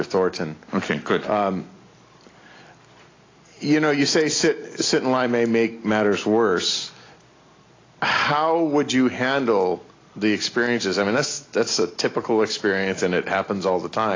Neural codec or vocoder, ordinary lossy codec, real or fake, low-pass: none; AAC, 32 kbps; real; 7.2 kHz